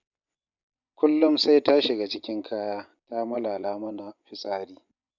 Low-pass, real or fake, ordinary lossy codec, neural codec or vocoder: 7.2 kHz; fake; none; vocoder, 24 kHz, 100 mel bands, Vocos